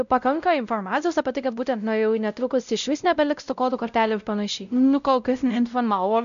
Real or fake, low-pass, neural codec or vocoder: fake; 7.2 kHz; codec, 16 kHz, 0.5 kbps, X-Codec, WavLM features, trained on Multilingual LibriSpeech